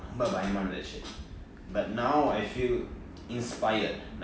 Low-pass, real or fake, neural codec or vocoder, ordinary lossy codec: none; real; none; none